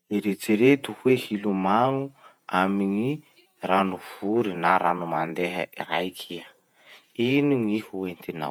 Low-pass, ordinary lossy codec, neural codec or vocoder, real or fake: 19.8 kHz; none; vocoder, 48 kHz, 128 mel bands, Vocos; fake